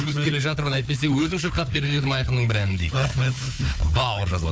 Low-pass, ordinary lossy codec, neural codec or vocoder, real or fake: none; none; codec, 16 kHz, 4 kbps, FunCodec, trained on Chinese and English, 50 frames a second; fake